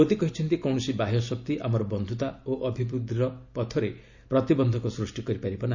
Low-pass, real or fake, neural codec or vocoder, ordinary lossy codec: 7.2 kHz; real; none; none